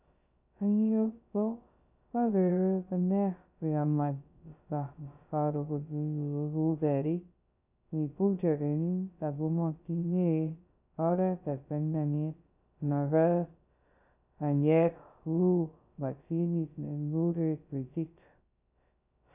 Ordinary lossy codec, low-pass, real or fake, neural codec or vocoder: none; 3.6 kHz; fake; codec, 16 kHz, 0.2 kbps, FocalCodec